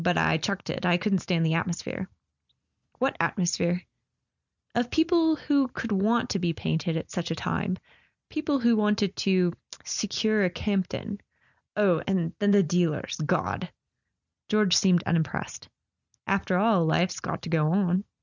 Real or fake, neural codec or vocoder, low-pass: real; none; 7.2 kHz